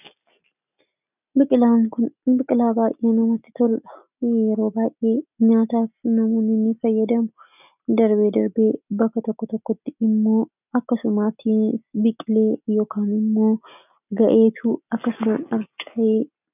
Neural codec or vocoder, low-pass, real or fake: none; 3.6 kHz; real